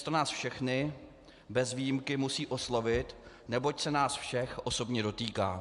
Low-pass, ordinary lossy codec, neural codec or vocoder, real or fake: 10.8 kHz; AAC, 96 kbps; none; real